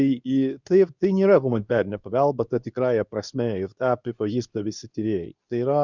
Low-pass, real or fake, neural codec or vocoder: 7.2 kHz; fake; codec, 24 kHz, 0.9 kbps, WavTokenizer, medium speech release version 2